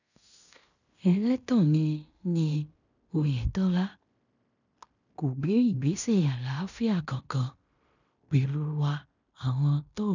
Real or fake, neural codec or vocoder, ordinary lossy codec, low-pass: fake; codec, 16 kHz in and 24 kHz out, 0.9 kbps, LongCat-Audio-Codec, fine tuned four codebook decoder; none; 7.2 kHz